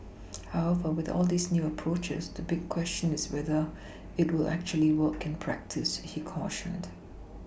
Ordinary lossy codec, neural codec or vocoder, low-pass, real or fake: none; none; none; real